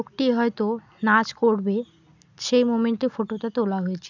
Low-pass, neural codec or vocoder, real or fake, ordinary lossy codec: 7.2 kHz; none; real; none